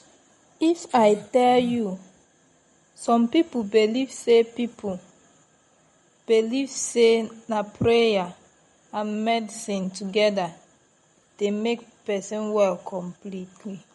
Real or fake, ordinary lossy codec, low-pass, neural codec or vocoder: real; MP3, 48 kbps; 19.8 kHz; none